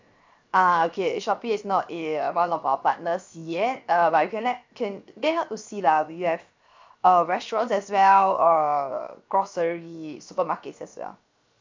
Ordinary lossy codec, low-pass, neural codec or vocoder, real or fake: MP3, 64 kbps; 7.2 kHz; codec, 16 kHz, 0.7 kbps, FocalCodec; fake